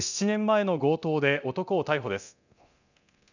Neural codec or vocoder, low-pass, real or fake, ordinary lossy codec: codec, 24 kHz, 0.9 kbps, DualCodec; 7.2 kHz; fake; none